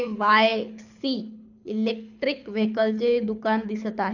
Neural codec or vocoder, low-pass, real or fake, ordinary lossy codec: codec, 24 kHz, 6 kbps, HILCodec; 7.2 kHz; fake; none